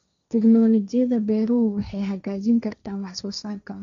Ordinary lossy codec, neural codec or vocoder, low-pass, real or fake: none; codec, 16 kHz, 1.1 kbps, Voila-Tokenizer; 7.2 kHz; fake